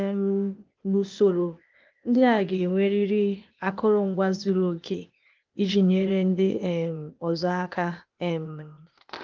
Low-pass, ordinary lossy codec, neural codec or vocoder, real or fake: 7.2 kHz; Opus, 24 kbps; codec, 16 kHz, 0.8 kbps, ZipCodec; fake